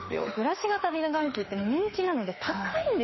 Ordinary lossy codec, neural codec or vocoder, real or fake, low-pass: MP3, 24 kbps; autoencoder, 48 kHz, 32 numbers a frame, DAC-VAE, trained on Japanese speech; fake; 7.2 kHz